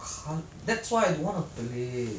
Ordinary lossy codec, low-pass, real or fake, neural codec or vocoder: none; none; real; none